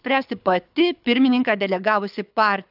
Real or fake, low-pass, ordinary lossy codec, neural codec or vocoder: fake; 5.4 kHz; AAC, 48 kbps; codec, 24 kHz, 6 kbps, HILCodec